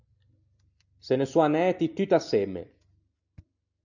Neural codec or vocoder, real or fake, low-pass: none; real; 7.2 kHz